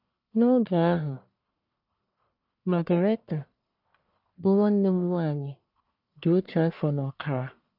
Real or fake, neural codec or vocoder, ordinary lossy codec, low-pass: fake; codec, 44.1 kHz, 1.7 kbps, Pupu-Codec; none; 5.4 kHz